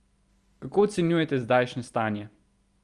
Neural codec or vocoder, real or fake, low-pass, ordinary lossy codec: none; real; 10.8 kHz; Opus, 32 kbps